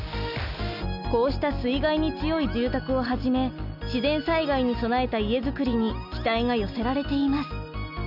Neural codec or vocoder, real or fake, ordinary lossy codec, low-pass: none; real; none; 5.4 kHz